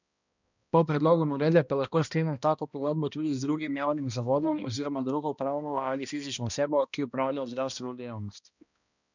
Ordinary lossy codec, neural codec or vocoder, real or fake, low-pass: none; codec, 16 kHz, 1 kbps, X-Codec, HuBERT features, trained on balanced general audio; fake; 7.2 kHz